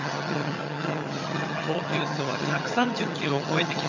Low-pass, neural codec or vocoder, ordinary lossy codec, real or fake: 7.2 kHz; vocoder, 22.05 kHz, 80 mel bands, HiFi-GAN; none; fake